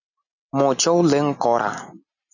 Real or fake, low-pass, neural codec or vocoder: real; 7.2 kHz; none